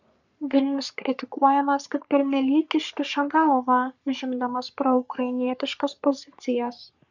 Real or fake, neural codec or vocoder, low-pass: fake; codec, 44.1 kHz, 3.4 kbps, Pupu-Codec; 7.2 kHz